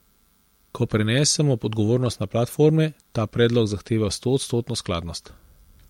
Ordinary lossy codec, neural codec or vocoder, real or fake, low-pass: MP3, 64 kbps; none; real; 19.8 kHz